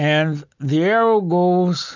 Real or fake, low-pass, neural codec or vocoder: real; 7.2 kHz; none